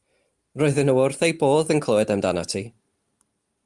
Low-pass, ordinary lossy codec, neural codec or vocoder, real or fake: 10.8 kHz; Opus, 32 kbps; none; real